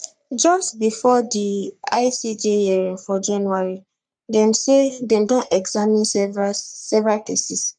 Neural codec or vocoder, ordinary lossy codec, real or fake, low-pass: codec, 44.1 kHz, 3.4 kbps, Pupu-Codec; none; fake; 9.9 kHz